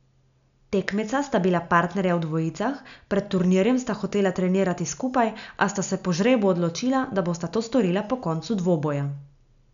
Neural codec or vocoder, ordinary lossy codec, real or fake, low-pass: none; none; real; 7.2 kHz